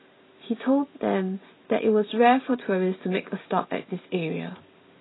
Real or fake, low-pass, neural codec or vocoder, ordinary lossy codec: real; 7.2 kHz; none; AAC, 16 kbps